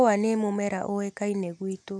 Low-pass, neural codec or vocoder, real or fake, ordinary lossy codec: none; none; real; none